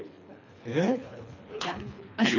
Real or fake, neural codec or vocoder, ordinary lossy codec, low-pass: fake; codec, 24 kHz, 3 kbps, HILCodec; none; 7.2 kHz